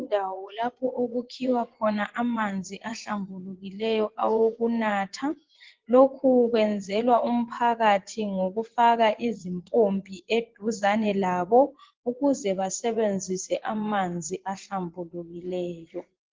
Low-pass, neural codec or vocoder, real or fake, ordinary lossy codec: 7.2 kHz; vocoder, 24 kHz, 100 mel bands, Vocos; fake; Opus, 16 kbps